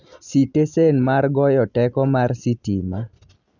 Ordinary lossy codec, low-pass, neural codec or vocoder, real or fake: none; 7.2 kHz; none; real